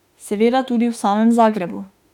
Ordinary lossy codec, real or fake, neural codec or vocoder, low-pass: none; fake; autoencoder, 48 kHz, 32 numbers a frame, DAC-VAE, trained on Japanese speech; 19.8 kHz